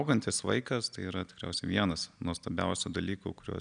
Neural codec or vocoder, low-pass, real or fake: none; 9.9 kHz; real